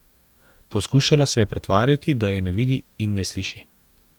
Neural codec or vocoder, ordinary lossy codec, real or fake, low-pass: codec, 44.1 kHz, 2.6 kbps, DAC; none; fake; 19.8 kHz